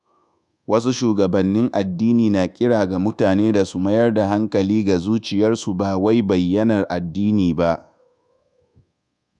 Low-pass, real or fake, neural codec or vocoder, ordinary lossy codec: 10.8 kHz; fake; codec, 24 kHz, 1.2 kbps, DualCodec; none